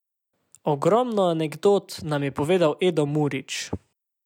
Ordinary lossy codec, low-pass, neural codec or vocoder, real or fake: none; 19.8 kHz; none; real